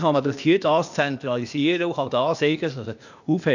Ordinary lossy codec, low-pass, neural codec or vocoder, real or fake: none; 7.2 kHz; codec, 16 kHz, 0.8 kbps, ZipCodec; fake